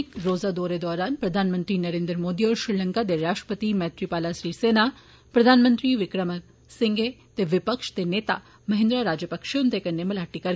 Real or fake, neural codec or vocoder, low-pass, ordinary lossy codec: real; none; none; none